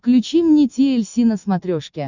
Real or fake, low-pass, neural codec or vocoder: real; 7.2 kHz; none